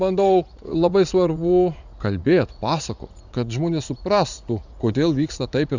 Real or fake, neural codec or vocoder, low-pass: fake; vocoder, 44.1 kHz, 80 mel bands, Vocos; 7.2 kHz